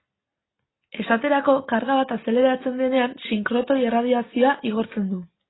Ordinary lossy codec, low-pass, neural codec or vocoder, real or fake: AAC, 16 kbps; 7.2 kHz; none; real